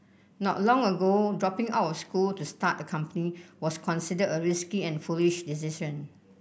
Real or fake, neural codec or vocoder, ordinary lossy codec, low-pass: real; none; none; none